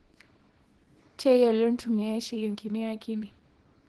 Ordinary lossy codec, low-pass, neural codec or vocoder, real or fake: Opus, 16 kbps; 10.8 kHz; codec, 24 kHz, 0.9 kbps, WavTokenizer, small release; fake